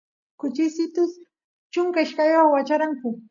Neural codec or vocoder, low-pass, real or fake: none; 7.2 kHz; real